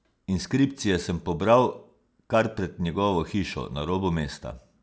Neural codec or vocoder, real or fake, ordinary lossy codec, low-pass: none; real; none; none